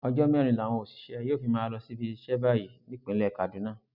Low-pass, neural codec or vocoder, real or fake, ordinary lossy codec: 5.4 kHz; none; real; none